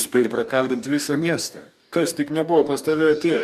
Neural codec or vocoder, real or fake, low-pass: codec, 44.1 kHz, 2.6 kbps, DAC; fake; 14.4 kHz